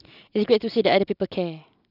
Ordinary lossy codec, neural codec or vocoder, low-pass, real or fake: none; none; 5.4 kHz; real